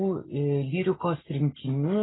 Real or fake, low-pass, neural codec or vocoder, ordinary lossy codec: real; 7.2 kHz; none; AAC, 16 kbps